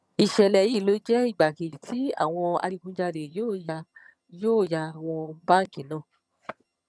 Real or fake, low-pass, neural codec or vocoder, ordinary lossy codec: fake; none; vocoder, 22.05 kHz, 80 mel bands, HiFi-GAN; none